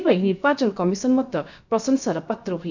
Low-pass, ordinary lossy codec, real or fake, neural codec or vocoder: 7.2 kHz; none; fake; codec, 16 kHz, about 1 kbps, DyCAST, with the encoder's durations